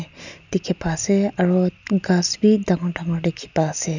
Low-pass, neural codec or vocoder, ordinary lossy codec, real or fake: 7.2 kHz; none; none; real